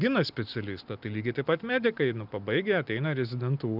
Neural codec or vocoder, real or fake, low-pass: none; real; 5.4 kHz